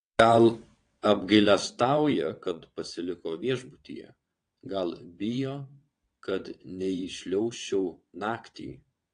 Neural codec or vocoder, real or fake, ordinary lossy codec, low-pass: vocoder, 22.05 kHz, 80 mel bands, WaveNeXt; fake; AAC, 48 kbps; 9.9 kHz